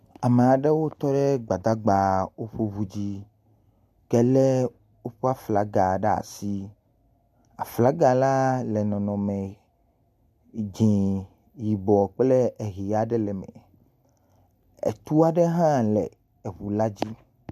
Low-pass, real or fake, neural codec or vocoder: 14.4 kHz; real; none